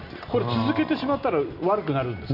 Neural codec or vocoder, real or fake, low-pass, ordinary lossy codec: none; real; 5.4 kHz; none